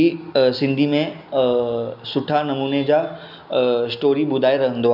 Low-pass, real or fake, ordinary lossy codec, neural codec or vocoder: 5.4 kHz; real; none; none